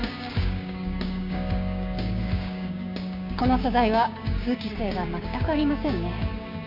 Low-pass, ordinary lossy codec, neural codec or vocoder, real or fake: 5.4 kHz; none; codec, 16 kHz, 6 kbps, DAC; fake